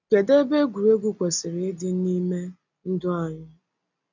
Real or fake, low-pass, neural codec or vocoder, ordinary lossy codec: real; 7.2 kHz; none; none